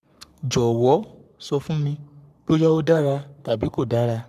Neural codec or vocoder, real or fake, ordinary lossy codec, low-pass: codec, 44.1 kHz, 3.4 kbps, Pupu-Codec; fake; none; 14.4 kHz